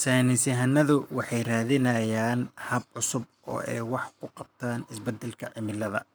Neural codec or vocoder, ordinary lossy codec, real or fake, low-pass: codec, 44.1 kHz, 7.8 kbps, Pupu-Codec; none; fake; none